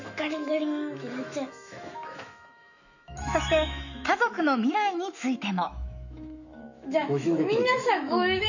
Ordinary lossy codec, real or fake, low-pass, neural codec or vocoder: none; fake; 7.2 kHz; autoencoder, 48 kHz, 128 numbers a frame, DAC-VAE, trained on Japanese speech